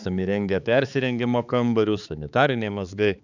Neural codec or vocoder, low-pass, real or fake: codec, 16 kHz, 4 kbps, X-Codec, HuBERT features, trained on balanced general audio; 7.2 kHz; fake